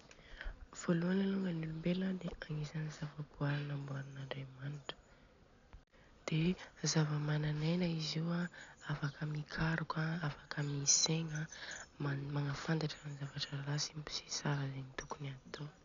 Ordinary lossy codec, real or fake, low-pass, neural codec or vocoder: none; real; 7.2 kHz; none